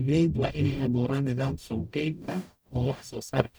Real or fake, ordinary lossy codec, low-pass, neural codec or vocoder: fake; none; none; codec, 44.1 kHz, 0.9 kbps, DAC